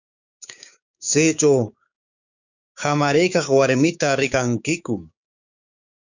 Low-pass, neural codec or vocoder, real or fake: 7.2 kHz; codec, 16 kHz, 6 kbps, DAC; fake